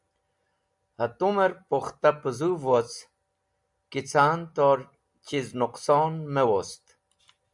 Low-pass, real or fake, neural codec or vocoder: 10.8 kHz; real; none